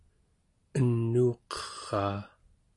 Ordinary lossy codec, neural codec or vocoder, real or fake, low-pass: AAC, 48 kbps; none; real; 10.8 kHz